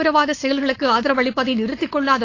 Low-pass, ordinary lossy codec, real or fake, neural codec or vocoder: 7.2 kHz; AAC, 32 kbps; fake; codec, 16 kHz, 4.8 kbps, FACodec